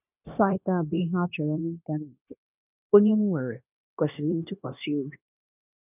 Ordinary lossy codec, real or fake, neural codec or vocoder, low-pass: none; fake; codec, 16 kHz, 0.9 kbps, LongCat-Audio-Codec; 3.6 kHz